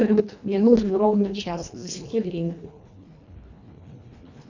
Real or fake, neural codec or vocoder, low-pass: fake; codec, 24 kHz, 1.5 kbps, HILCodec; 7.2 kHz